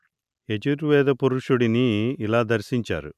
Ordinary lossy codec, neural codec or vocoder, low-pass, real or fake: none; none; 14.4 kHz; real